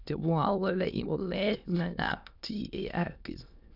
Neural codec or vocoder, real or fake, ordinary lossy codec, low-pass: autoencoder, 22.05 kHz, a latent of 192 numbers a frame, VITS, trained on many speakers; fake; none; 5.4 kHz